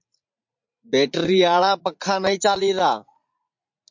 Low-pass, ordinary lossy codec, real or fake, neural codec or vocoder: 7.2 kHz; MP3, 48 kbps; fake; vocoder, 44.1 kHz, 80 mel bands, Vocos